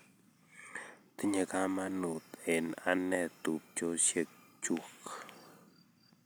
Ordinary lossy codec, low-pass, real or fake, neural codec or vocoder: none; none; real; none